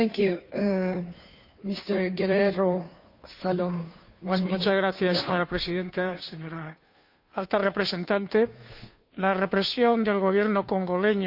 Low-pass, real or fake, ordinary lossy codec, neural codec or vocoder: 5.4 kHz; fake; none; codec, 16 kHz, 2 kbps, FunCodec, trained on Chinese and English, 25 frames a second